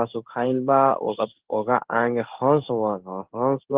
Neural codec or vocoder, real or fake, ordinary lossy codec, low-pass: codec, 16 kHz in and 24 kHz out, 1 kbps, XY-Tokenizer; fake; Opus, 16 kbps; 3.6 kHz